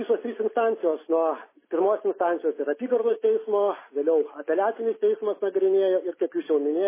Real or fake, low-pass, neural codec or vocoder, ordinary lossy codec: real; 3.6 kHz; none; MP3, 16 kbps